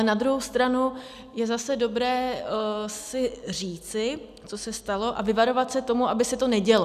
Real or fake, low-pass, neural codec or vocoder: real; 14.4 kHz; none